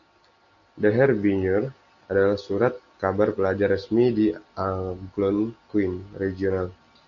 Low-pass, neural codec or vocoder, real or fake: 7.2 kHz; none; real